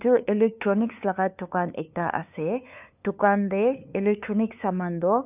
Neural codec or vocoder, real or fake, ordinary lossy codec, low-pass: codec, 16 kHz, 2 kbps, FunCodec, trained on LibriTTS, 25 frames a second; fake; none; 3.6 kHz